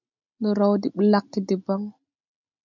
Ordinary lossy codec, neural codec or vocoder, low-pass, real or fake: MP3, 64 kbps; none; 7.2 kHz; real